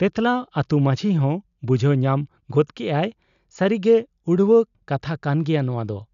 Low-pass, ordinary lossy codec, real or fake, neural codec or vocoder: 7.2 kHz; none; real; none